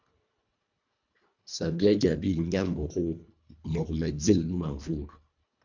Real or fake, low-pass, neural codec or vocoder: fake; 7.2 kHz; codec, 24 kHz, 1.5 kbps, HILCodec